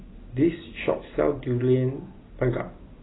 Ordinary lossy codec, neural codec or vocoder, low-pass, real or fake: AAC, 16 kbps; none; 7.2 kHz; real